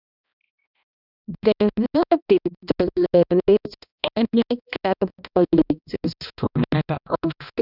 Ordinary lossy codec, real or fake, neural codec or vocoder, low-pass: none; fake; codec, 16 kHz, 1 kbps, X-Codec, HuBERT features, trained on balanced general audio; 5.4 kHz